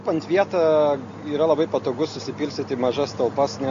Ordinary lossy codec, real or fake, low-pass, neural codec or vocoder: AAC, 64 kbps; real; 7.2 kHz; none